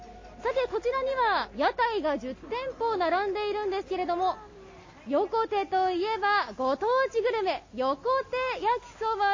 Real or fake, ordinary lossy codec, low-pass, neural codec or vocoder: real; MP3, 32 kbps; 7.2 kHz; none